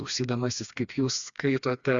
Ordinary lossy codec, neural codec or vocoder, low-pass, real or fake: Opus, 64 kbps; codec, 16 kHz, 2 kbps, FreqCodec, smaller model; 7.2 kHz; fake